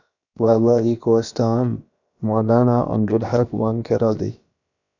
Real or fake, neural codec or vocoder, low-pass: fake; codec, 16 kHz, about 1 kbps, DyCAST, with the encoder's durations; 7.2 kHz